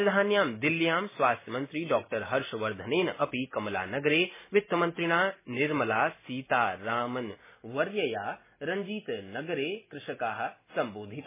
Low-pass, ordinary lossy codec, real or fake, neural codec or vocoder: 3.6 kHz; MP3, 16 kbps; real; none